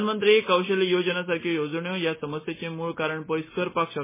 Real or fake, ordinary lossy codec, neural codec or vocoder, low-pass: real; MP3, 16 kbps; none; 3.6 kHz